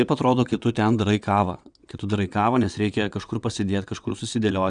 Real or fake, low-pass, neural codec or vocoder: fake; 9.9 kHz; vocoder, 22.05 kHz, 80 mel bands, WaveNeXt